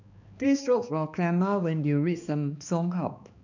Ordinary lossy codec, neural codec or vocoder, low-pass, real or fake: none; codec, 16 kHz, 2 kbps, X-Codec, HuBERT features, trained on balanced general audio; 7.2 kHz; fake